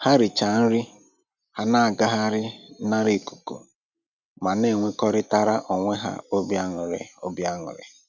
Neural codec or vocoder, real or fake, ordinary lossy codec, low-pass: none; real; none; 7.2 kHz